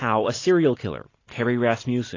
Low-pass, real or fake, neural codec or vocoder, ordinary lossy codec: 7.2 kHz; real; none; AAC, 32 kbps